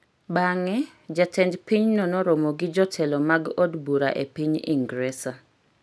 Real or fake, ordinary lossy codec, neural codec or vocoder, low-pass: real; none; none; none